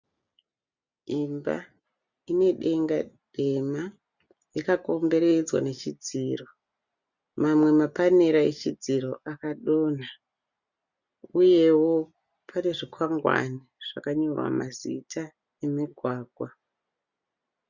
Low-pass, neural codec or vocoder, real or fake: 7.2 kHz; none; real